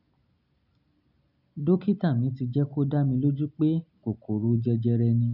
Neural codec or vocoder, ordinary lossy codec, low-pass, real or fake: none; none; 5.4 kHz; real